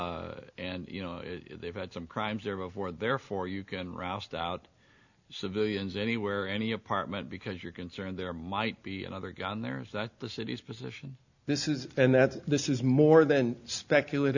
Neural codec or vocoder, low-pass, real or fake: none; 7.2 kHz; real